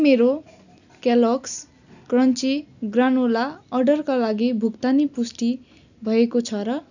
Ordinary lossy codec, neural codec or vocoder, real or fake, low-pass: none; none; real; 7.2 kHz